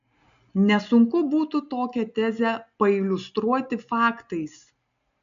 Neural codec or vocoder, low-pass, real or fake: none; 7.2 kHz; real